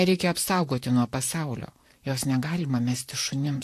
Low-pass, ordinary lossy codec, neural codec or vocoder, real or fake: 14.4 kHz; AAC, 64 kbps; vocoder, 44.1 kHz, 128 mel bands, Pupu-Vocoder; fake